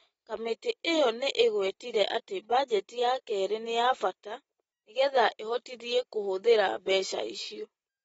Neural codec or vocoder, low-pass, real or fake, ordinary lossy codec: none; 19.8 kHz; real; AAC, 24 kbps